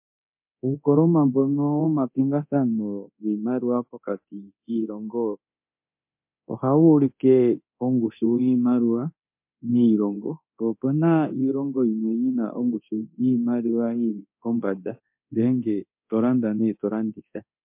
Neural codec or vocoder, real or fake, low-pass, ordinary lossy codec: codec, 24 kHz, 0.9 kbps, DualCodec; fake; 3.6 kHz; MP3, 32 kbps